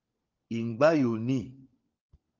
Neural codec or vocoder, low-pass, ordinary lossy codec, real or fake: codec, 16 kHz, 4 kbps, FunCodec, trained on LibriTTS, 50 frames a second; 7.2 kHz; Opus, 32 kbps; fake